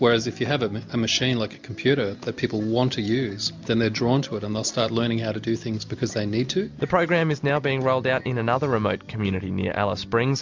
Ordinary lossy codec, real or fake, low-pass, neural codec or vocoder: MP3, 64 kbps; real; 7.2 kHz; none